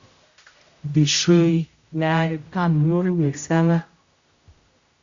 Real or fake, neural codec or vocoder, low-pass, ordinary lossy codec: fake; codec, 16 kHz, 0.5 kbps, X-Codec, HuBERT features, trained on general audio; 7.2 kHz; Opus, 64 kbps